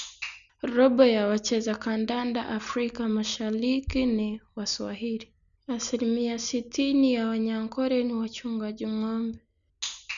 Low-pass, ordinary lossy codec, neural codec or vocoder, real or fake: 7.2 kHz; none; none; real